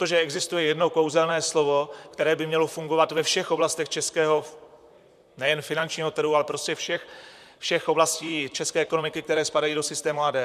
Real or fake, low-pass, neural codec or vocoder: fake; 14.4 kHz; vocoder, 44.1 kHz, 128 mel bands, Pupu-Vocoder